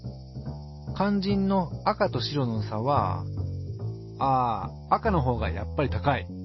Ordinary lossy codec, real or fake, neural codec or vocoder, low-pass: MP3, 24 kbps; real; none; 7.2 kHz